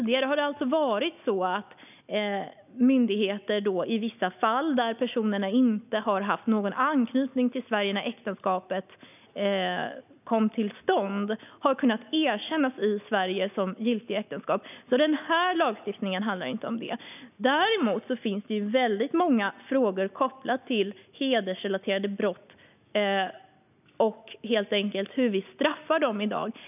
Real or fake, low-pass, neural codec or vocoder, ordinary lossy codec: real; 3.6 kHz; none; none